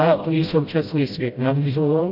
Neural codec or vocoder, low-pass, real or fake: codec, 16 kHz, 0.5 kbps, FreqCodec, smaller model; 5.4 kHz; fake